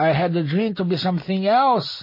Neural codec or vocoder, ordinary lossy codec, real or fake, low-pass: none; MP3, 24 kbps; real; 5.4 kHz